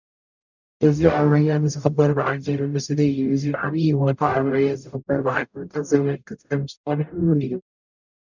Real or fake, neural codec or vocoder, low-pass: fake; codec, 44.1 kHz, 0.9 kbps, DAC; 7.2 kHz